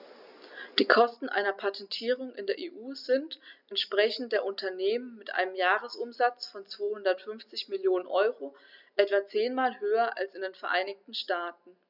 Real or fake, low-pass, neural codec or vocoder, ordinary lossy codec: real; 5.4 kHz; none; none